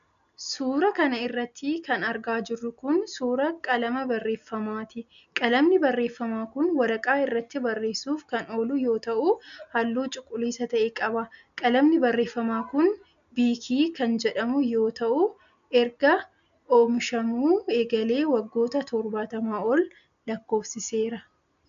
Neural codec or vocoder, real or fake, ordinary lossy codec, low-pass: none; real; MP3, 96 kbps; 7.2 kHz